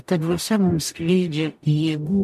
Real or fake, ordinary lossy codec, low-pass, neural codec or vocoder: fake; MP3, 64 kbps; 14.4 kHz; codec, 44.1 kHz, 0.9 kbps, DAC